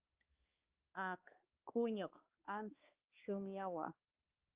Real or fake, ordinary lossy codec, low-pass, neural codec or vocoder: fake; Opus, 24 kbps; 3.6 kHz; codec, 16 kHz, 2 kbps, X-Codec, HuBERT features, trained on balanced general audio